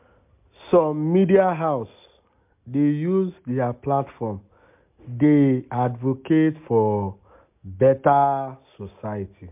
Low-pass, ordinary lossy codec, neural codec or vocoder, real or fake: 3.6 kHz; MP3, 32 kbps; none; real